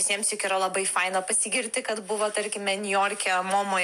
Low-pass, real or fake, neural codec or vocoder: 14.4 kHz; real; none